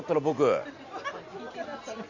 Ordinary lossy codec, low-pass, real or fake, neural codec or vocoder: Opus, 64 kbps; 7.2 kHz; real; none